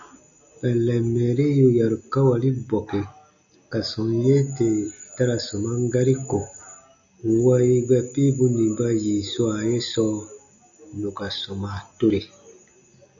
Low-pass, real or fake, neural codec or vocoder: 7.2 kHz; real; none